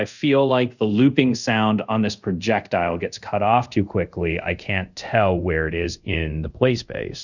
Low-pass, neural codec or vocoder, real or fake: 7.2 kHz; codec, 24 kHz, 0.5 kbps, DualCodec; fake